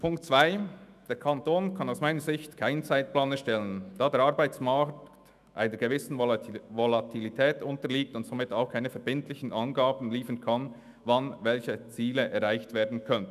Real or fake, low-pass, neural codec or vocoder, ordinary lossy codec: fake; 14.4 kHz; autoencoder, 48 kHz, 128 numbers a frame, DAC-VAE, trained on Japanese speech; none